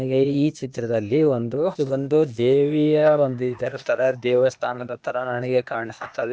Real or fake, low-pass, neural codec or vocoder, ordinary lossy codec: fake; none; codec, 16 kHz, 0.8 kbps, ZipCodec; none